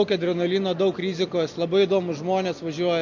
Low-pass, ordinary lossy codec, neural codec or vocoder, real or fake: 7.2 kHz; MP3, 48 kbps; none; real